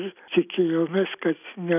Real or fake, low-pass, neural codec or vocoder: real; 3.6 kHz; none